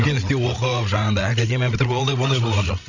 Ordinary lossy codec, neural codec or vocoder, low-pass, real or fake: none; codec, 16 kHz, 16 kbps, FreqCodec, larger model; 7.2 kHz; fake